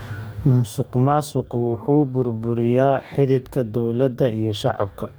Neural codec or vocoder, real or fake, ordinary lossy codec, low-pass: codec, 44.1 kHz, 2.6 kbps, DAC; fake; none; none